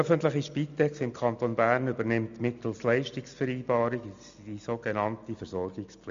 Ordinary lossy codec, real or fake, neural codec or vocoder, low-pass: MP3, 64 kbps; real; none; 7.2 kHz